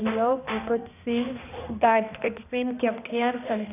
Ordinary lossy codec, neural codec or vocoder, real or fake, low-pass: none; codec, 16 kHz, 1 kbps, X-Codec, HuBERT features, trained on balanced general audio; fake; 3.6 kHz